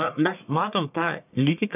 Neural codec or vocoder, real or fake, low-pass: codec, 44.1 kHz, 1.7 kbps, Pupu-Codec; fake; 3.6 kHz